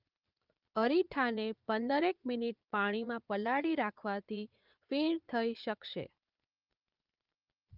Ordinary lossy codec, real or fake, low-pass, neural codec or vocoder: Opus, 24 kbps; fake; 5.4 kHz; vocoder, 22.05 kHz, 80 mel bands, Vocos